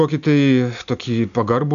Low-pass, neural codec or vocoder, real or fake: 7.2 kHz; none; real